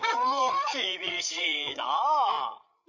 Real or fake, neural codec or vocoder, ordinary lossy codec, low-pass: fake; codec, 16 kHz, 8 kbps, FreqCodec, larger model; none; 7.2 kHz